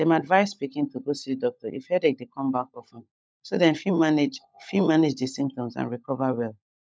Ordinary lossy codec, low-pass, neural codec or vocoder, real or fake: none; none; codec, 16 kHz, 16 kbps, FunCodec, trained on LibriTTS, 50 frames a second; fake